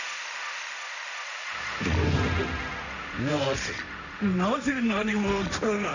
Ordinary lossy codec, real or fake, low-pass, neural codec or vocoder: none; fake; 7.2 kHz; codec, 16 kHz, 1.1 kbps, Voila-Tokenizer